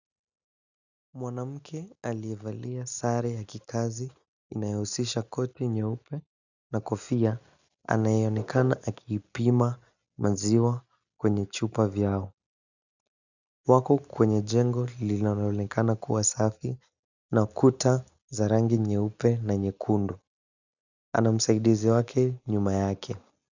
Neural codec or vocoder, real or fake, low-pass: none; real; 7.2 kHz